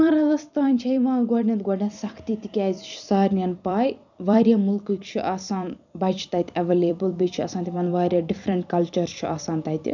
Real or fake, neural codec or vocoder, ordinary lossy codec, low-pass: fake; vocoder, 44.1 kHz, 128 mel bands every 256 samples, BigVGAN v2; none; 7.2 kHz